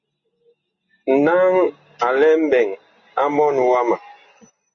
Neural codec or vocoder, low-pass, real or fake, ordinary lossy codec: none; 7.2 kHz; real; Opus, 64 kbps